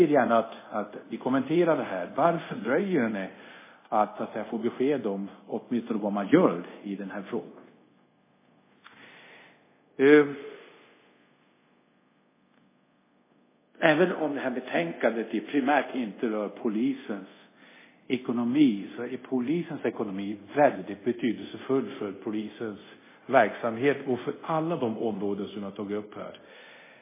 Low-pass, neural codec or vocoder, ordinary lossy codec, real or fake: 3.6 kHz; codec, 24 kHz, 0.5 kbps, DualCodec; MP3, 16 kbps; fake